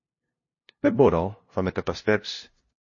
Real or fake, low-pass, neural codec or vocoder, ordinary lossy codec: fake; 7.2 kHz; codec, 16 kHz, 0.5 kbps, FunCodec, trained on LibriTTS, 25 frames a second; MP3, 32 kbps